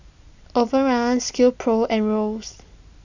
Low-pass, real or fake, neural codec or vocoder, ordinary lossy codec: 7.2 kHz; real; none; none